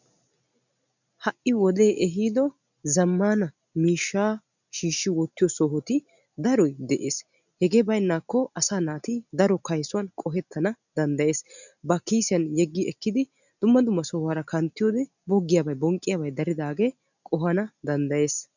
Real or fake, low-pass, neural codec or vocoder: real; 7.2 kHz; none